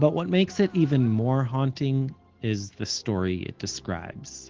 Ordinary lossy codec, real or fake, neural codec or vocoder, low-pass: Opus, 16 kbps; real; none; 7.2 kHz